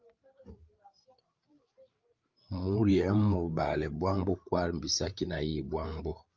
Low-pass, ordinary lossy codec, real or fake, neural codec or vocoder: 7.2 kHz; Opus, 24 kbps; fake; codec, 16 kHz, 8 kbps, FreqCodec, larger model